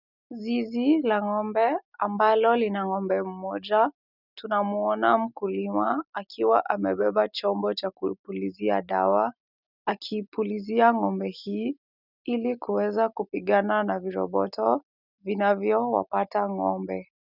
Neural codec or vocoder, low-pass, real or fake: none; 5.4 kHz; real